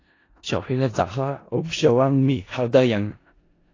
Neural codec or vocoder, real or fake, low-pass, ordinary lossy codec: codec, 16 kHz in and 24 kHz out, 0.4 kbps, LongCat-Audio-Codec, four codebook decoder; fake; 7.2 kHz; AAC, 32 kbps